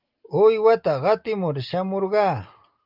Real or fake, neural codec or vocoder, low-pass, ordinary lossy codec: real; none; 5.4 kHz; Opus, 32 kbps